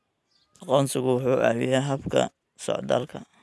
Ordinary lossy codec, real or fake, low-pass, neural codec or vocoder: none; real; none; none